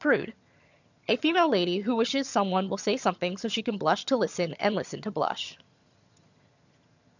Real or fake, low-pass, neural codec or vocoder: fake; 7.2 kHz; vocoder, 22.05 kHz, 80 mel bands, HiFi-GAN